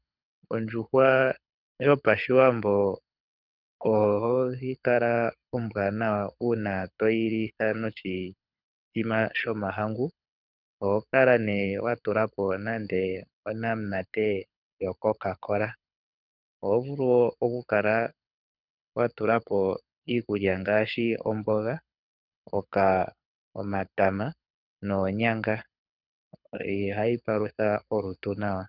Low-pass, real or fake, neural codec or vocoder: 5.4 kHz; fake; codec, 24 kHz, 6 kbps, HILCodec